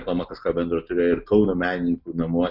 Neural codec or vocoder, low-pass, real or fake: none; 5.4 kHz; real